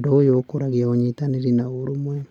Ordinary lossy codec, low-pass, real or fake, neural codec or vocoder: none; 19.8 kHz; real; none